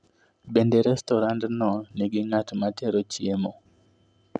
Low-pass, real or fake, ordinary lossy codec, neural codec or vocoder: 9.9 kHz; real; none; none